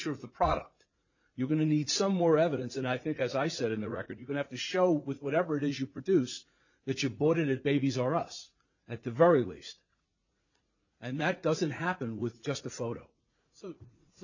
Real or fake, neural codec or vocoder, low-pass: fake; vocoder, 44.1 kHz, 80 mel bands, Vocos; 7.2 kHz